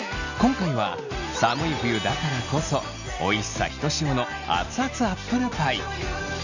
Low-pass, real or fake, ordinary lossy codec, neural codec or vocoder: 7.2 kHz; real; none; none